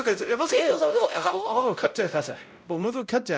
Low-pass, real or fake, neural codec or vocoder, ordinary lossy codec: none; fake; codec, 16 kHz, 0.5 kbps, X-Codec, WavLM features, trained on Multilingual LibriSpeech; none